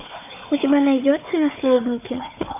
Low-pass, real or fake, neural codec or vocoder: 3.6 kHz; fake; codec, 16 kHz, 4 kbps, FunCodec, trained on Chinese and English, 50 frames a second